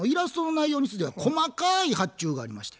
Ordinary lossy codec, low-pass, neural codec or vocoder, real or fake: none; none; none; real